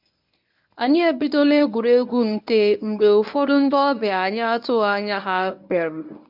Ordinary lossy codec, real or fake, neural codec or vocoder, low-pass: none; fake; codec, 24 kHz, 0.9 kbps, WavTokenizer, medium speech release version 1; 5.4 kHz